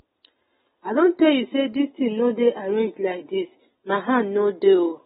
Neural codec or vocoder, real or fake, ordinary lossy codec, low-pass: none; real; AAC, 16 kbps; 10.8 kHz